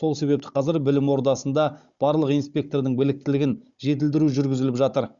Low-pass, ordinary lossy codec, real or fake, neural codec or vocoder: 7.2 kHz; Opus, 64 kbps; fake; codec, 16 kHz, 16 kbps, FunCodec, trained on Chinese and English, 50 frames a second